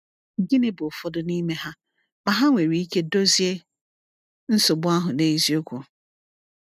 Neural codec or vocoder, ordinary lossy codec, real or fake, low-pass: none; none; real; 14.4 kHz